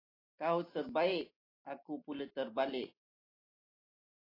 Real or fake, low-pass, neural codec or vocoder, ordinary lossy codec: real; 5.4 kHz; none; AAC, 24 kbps